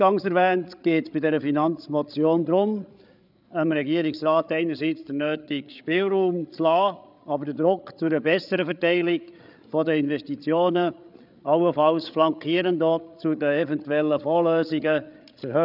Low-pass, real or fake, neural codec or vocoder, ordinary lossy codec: 5.4 kHz; fake; codec, 16 kHz, 8 kbps, FreqCodec, larger model; none